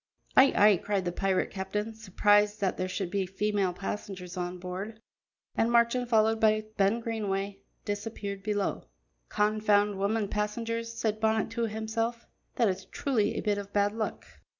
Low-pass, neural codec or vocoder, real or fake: 7.2 kHz; none; real